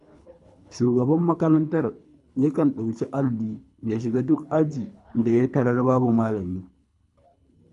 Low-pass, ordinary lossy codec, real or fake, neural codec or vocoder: 10.8 kHz; none; fake; codec, 24 kHz, 3 kbps, HILCodec